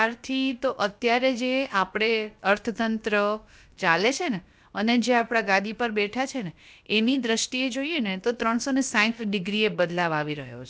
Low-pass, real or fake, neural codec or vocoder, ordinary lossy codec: none; fake; codec, 16 kHz, about 1 kbps, DyCAST, with the encoder's durations; none